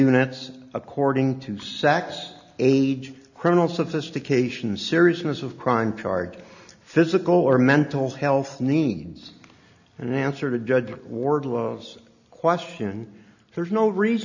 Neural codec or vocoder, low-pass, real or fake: none; 7.2 kHz; real